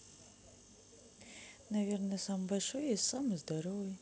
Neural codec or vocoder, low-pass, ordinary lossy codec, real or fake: none; none; none; real